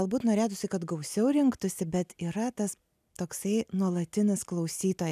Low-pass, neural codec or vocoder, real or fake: 14.4 kHz; none; real